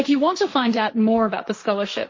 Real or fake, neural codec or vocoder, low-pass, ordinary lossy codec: fake; codec, 16 kHz, 1.1 kbps, Voila-Tokenizer; 7.2 kHz; MP3, 32 kbps